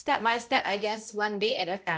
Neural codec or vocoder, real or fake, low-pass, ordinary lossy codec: codec, 16 kHz, 0.5 kbps, X-Codec, HuBERT features, trained on balanced general audio; fake; none; none